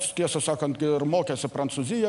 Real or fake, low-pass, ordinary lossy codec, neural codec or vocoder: real; 10.8 kHz; MP3, 96 kbps; none